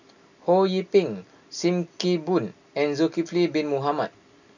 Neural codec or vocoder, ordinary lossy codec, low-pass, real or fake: none; none; 7.2 kHz; real